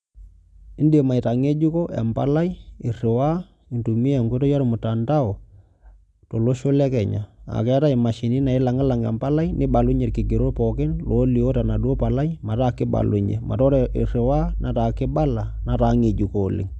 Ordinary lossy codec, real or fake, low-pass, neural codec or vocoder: none; real; none; none